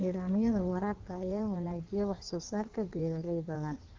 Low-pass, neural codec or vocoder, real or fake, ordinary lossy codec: 7.2 kHz; codec, 16 kHz in and 24 kHz out, 1.1 kbps, FireRedTTS-2 codec; fake; Opus, 16 kbps